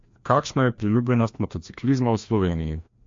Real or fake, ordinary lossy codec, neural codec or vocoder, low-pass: fake; MP3, 48 kbps; codec, 16 kHz, 1 kbps, FreqCodec, larger model; 7.2 kHz